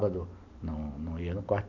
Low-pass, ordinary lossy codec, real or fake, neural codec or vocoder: 7.2 kHz; none; real; none